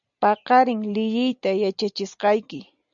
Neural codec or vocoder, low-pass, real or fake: none; 7.2 kHz; real